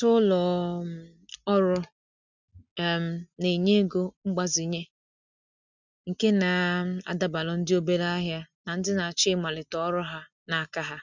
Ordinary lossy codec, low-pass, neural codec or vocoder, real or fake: none; 7.2 kHz; none; real